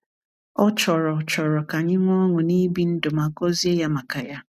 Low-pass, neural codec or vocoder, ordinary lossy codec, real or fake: 14.4 kHz; none; none; real